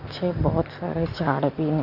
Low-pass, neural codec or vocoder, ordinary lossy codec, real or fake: 5.4 kHz; none; none; real